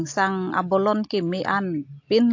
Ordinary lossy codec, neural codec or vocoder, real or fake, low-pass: AAC, 48 kbps; none; real; 7.2 kHz